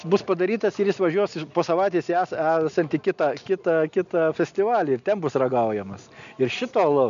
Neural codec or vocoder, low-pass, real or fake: none; 7.2 kHz; real